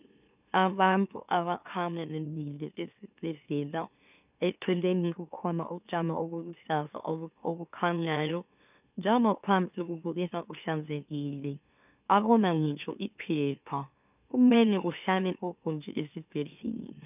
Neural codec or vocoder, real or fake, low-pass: autoencoder, 44.1 kHz, a latent of 192 numbers a frame, MeloTTS; fake; 3.6 kHz